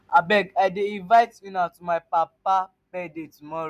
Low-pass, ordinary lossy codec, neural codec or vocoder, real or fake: 14.4 kHz; none; none; real